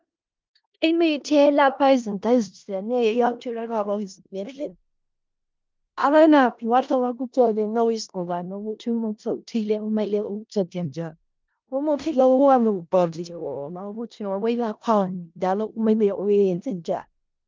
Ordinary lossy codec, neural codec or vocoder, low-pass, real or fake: Opus, 24 kbps; codec, 16 kHz in and 24 kHz out, 0.4 kbps, LongCat-Audio-Codec, four codebook decoder; 7.2 kHz; fake